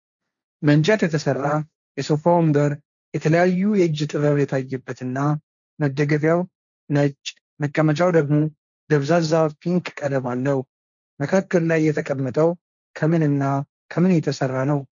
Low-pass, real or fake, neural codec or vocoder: 7.2 kHz; fake; codec, 16 kHz, 1.1 kbps, Voila-Tokenizer